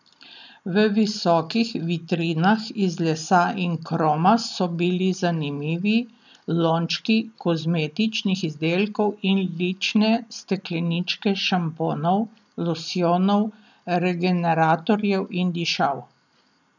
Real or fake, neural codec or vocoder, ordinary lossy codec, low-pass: real; none; none; 7.2 kHz